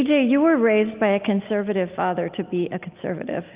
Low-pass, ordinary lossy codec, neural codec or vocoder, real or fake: 3.6 kHz; Opus, 24 kbps; none; real